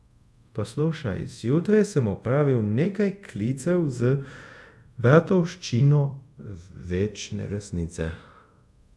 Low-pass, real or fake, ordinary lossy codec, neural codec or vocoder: none; fake; none; codec, 24 kHz, 0.5 kbps, DualCodec